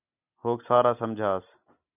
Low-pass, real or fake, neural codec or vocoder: 3.6 kHz; real; none